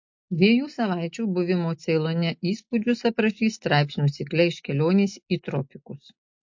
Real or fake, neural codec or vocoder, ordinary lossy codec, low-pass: real; none; MP3, 48 kbps; 7.2 kHz